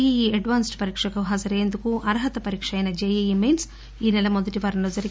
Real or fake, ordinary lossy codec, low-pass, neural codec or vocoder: real; none; none; none